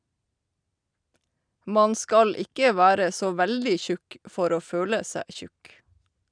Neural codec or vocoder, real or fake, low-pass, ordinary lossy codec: none; real; 9.9 kHz; none